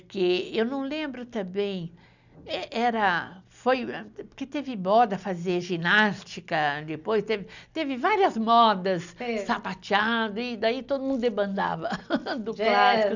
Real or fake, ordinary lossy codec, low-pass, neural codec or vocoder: real; none; 7.2 kHz; none